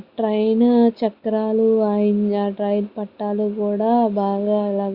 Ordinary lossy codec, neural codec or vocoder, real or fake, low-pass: Opus, 64 kbps; none; real; 5.4 kHz